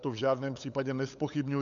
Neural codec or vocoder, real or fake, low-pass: codec, 16 kHz, 8 kbps, FunCodec, trained on LibriTTS, 25 frames a second; fake; 7.2 kHz